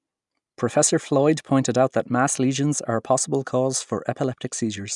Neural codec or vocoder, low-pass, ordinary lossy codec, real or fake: none; 10.8 kHz; none; real